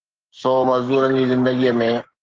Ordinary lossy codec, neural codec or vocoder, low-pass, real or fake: Opus, 16 kbps; codec, 16 kHz, 6 kbps, DAC; 7.2 kHz; fake